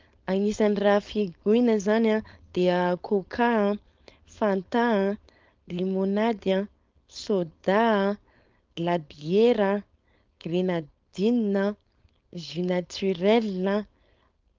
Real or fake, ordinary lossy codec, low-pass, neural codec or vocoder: fake; Opus, 24 kbps; 7.2 kHz; codec, 16 kHz, 4.8 kbps, FACodec